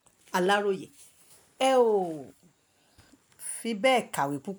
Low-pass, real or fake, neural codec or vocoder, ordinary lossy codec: none; real; none; none